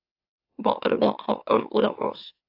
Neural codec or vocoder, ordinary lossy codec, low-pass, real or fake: autoencoder, 44.1 kHz, a latent of 192 numbers a frame, MeloTTS; AAC, 32 kbps; 5.4 kHz; fake